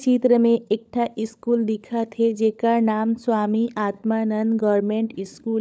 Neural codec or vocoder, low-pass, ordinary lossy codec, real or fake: codec, 16 kHz, 16 kbps, FunCodec, trained on LibriTTS, 50 frames a second; none; none; fake